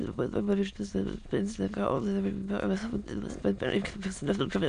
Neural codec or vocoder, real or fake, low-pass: autoencoder, 22.05 kHz, a latent of 192 numbers a frame, VITS, trained on many speakers; fake; 9.9 kHz